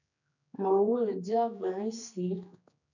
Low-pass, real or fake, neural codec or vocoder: 7.2 kHz; fake; codec, 16 kHz, 2 kbps, X-Codec, HuBERT features, trained on general audio